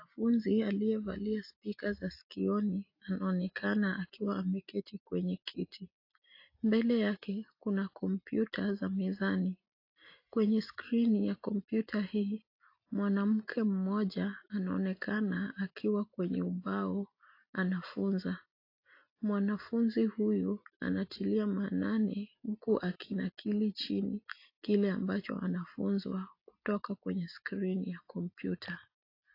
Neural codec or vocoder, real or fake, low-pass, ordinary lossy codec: none; real; 5.4 kHz; AAC, 32 kbps